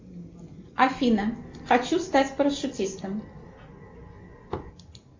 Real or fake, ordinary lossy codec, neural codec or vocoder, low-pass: real; MP3, 64 kbps; none; 7.2 kHz